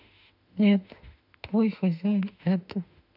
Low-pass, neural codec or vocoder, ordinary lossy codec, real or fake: 5.4 kHz; autoencoder, 48 kHz, 32 numbers a frame, DAC-VAE, trained on Japanese speech; none; fake